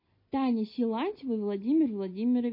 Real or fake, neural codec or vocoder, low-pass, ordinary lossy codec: real; none; 5.4 kHz; MP3, 24 kbps